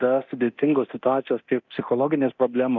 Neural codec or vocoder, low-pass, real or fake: codec, 24 kHz, 0.9 kbps, DualCodec; 7.2 kHz; fake